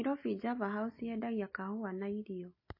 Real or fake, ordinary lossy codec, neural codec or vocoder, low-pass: real; MP3, 24 kbps; none; 5.4 kHz